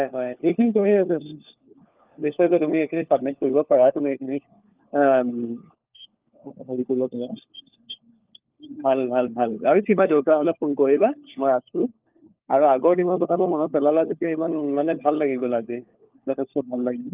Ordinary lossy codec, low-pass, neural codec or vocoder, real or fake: Opus, 32 kbps; 3.6 kHz; codec, 16 kHz, 4 kbps, FunCodec, trained on LibriTTS, 50 frames a second; fake